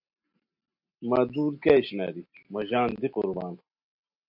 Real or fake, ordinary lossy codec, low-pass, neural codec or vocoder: real; MP3, 48 kbps; 5.4 kHz; none